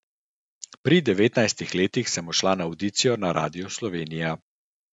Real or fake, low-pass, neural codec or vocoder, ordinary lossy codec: real; 10.8 kHz; none; none